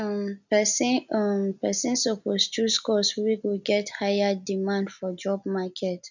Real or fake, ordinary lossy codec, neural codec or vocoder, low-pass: real; none; none; 7.2 kHz